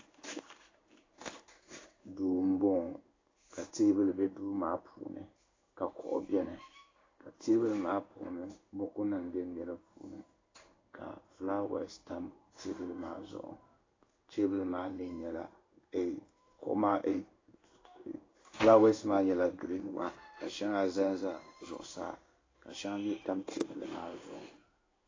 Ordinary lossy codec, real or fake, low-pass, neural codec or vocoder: AAC, 32 kbps; fake; 7.2 kHz; codec, 16 kHz in and 24 kHz out, 1 kbps, XY-Tokenizer